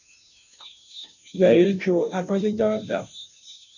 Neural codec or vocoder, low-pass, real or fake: codec, 16 kHz, 0.5 kbps, FunCodec, trained on Chinese and English, 25 frames a second; 7.2 kHz; fake